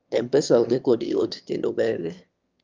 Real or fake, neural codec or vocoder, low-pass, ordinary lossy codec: fake; autoencoder, 22.05 kHz, a latent of 192 numbers a frame, VITS, trained on one speaker; 7.2 kHz; Opus, 24 kbps